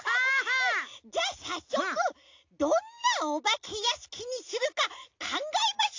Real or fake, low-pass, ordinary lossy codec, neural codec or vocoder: real; 7.2 kHz; AAC, 48 kbps; none